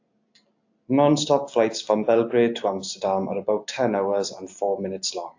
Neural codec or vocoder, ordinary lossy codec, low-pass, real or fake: none; AAC, 48 kbps; 7.2 kHz; real